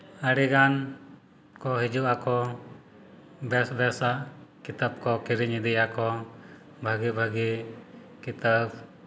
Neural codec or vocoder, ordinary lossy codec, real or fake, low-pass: none; none; real; none